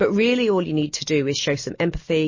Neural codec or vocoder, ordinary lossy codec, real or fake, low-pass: none; MP3, 32 kbps; real; 7.2 kHz